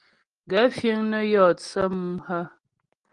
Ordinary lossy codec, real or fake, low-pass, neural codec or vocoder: Opus, 24 kbps; real; 9.9 kHz; none